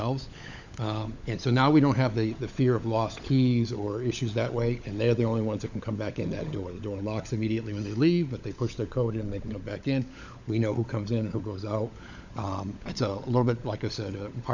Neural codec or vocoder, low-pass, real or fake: codec, 16 kHz, 16 kbps, FunCodec, trained on LibriTTS, 50 frames a second; 7.2 kHz; fake